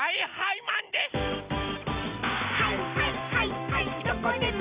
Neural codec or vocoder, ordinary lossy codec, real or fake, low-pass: none; Opus, 64 kbps; real; 3.6 kHz